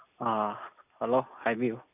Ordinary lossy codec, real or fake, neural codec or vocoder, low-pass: none; real; none; 3.6 kHz